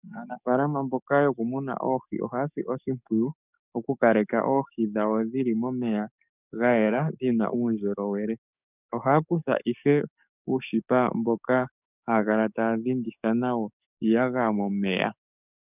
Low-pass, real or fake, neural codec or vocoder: 3.6 kHz; fake; codec, 44.1 kHz, 7.8 kbps, DAC